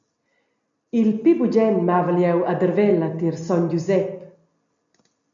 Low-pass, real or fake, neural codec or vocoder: 7.2 kHz; real; none